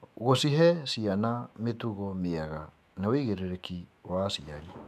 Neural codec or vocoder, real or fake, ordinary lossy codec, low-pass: autoencoder, 48 kHz, 128 numbers a frame, DAC-VAE, trained on Japanese speech; fake; MP3, 96 kbps; 14.4 kHz